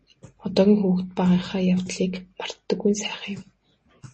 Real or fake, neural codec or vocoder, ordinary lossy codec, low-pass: real; none; MP3, 32 kbps; 9.9 kHz